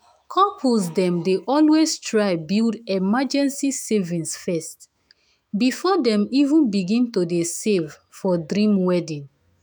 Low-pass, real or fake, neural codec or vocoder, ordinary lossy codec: none; fake; autoencoder, 48 kHz, 128 numbers a frame, DAC-VAE, trained on Japanese speech; none